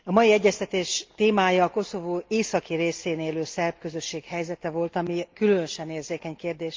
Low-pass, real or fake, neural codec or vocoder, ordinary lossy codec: 7.2 kHz; real; none; Opus, 32 kbps